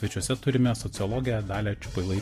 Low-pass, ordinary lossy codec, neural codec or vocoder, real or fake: 14.4 kHz; MP3, 64 kbps; none; real